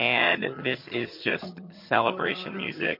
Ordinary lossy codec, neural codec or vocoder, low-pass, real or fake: MP3, 32 kbps; vocoder, 22.05 kHz, 80 mel bands, HiFi-GAN; 5.4 kHz; fake